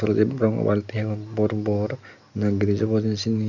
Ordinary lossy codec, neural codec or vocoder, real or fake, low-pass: none; none; real; 7.2 kHz